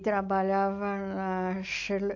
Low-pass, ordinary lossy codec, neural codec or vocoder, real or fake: 7.2 kHz; AAC, 48 kbps; none; real